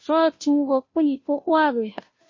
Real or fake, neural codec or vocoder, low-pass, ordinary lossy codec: fake; codec, 16 kHz, 0.5 kbps, FunCodec, trained on Chinese and English, 25 frames a second; 7.2 kHz; MP3, 32 kbps